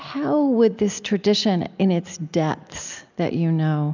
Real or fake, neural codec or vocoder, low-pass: real; none; 7.2 kHz